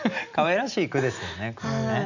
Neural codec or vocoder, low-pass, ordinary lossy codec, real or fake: none; 7.2 kHz; none; real